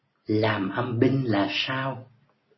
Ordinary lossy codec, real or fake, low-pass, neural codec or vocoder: MP3, 24 kbps; real; 7.2 kHz; none